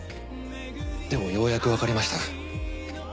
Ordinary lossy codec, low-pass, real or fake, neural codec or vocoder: none; none; real; none